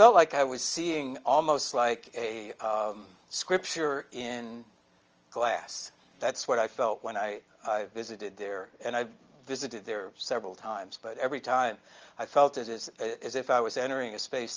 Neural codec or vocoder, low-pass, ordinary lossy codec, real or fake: none; 7.2 kHz; Opus, 24 kbps; real